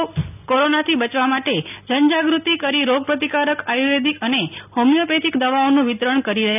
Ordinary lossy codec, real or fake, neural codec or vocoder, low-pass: none; real; none; 3.6 kHz